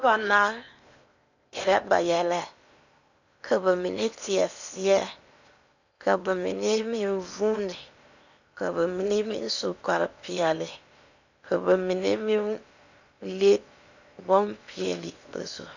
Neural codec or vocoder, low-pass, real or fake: codec, 16 kHz in and 24 kHz out, 0.8 kbps, FocalCodec, streaming, 65536 codes; 7.2 kHz; fake